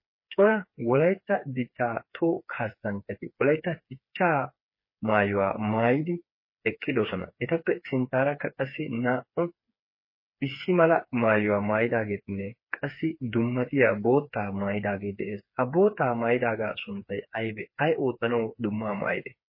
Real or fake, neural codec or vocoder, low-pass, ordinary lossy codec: fake; codec, 16 kHz, 4 kbps, FreqCodec, smaller model; 5.4 kHz; MP3, 24 kbps